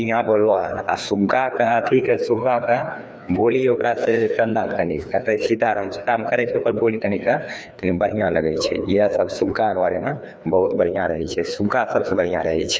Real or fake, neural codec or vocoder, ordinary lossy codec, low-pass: fake; codec, 16 kHz, 2 kbps, FreqCodec, larger model; none; none